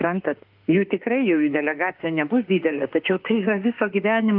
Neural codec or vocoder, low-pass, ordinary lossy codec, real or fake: autoencoder, 48 kHz, 32 numbers a frame, DAC-VAE, trained on Japanese speech; 5.4 kHz; Opus, 24 kbps; fake